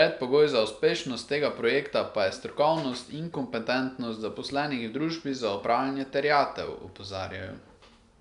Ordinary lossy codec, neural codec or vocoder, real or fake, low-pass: none; none; real; 10.8 kHz